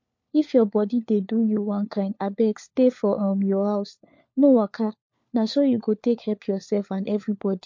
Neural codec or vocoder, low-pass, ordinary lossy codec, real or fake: codec, 16 kHz, 4 kbps, FunCodec, trained on LibriTTS, 50 frames a second; 7.2 kHz; MP3, 48 kbps; fake